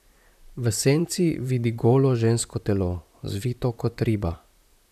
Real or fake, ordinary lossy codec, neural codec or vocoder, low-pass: fake; none; vocoder, 44.1 kHz, 128 mel bands, Pupu-Vocoder; 14.4 kHz